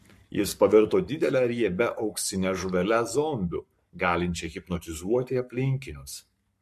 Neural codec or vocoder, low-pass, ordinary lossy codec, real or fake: codec, 44.1 kHz, 7.8 kbps, Pupu-Codec; 14.4 kHz; MP3, 64 kbps; fake